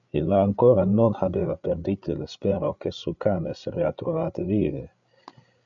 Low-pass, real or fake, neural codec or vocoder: 7.2 kHz; fake; codec, 16 kHz, 8 kbps, FreqCodec, larger model